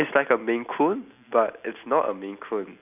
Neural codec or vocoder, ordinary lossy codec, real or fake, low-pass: none; none; real; 3.6 kHz